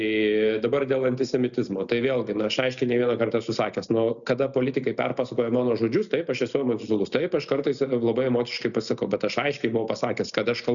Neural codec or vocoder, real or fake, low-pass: none; real; 7.2 kHz